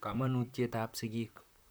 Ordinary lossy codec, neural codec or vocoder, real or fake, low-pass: none; vocoder, 44.1 kHz, 128 mel bands, Pupu-Vocoder; fake; none